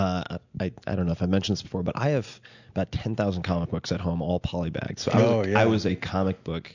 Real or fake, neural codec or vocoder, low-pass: real; none; 7.2 kHz